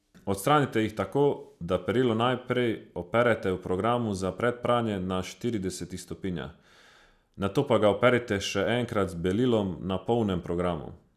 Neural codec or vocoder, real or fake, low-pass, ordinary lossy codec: none; real; 14.4 kHz; none